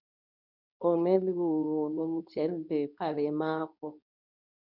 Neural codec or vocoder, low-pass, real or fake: codec, 24 kHz, 0.9 kbps, WavTokenizer, medium speech release version 2; 5.4 kHz; fake